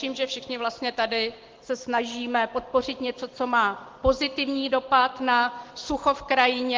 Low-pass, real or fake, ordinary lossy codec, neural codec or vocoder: 7.2 kHz; real; Opus, 16 kbps; none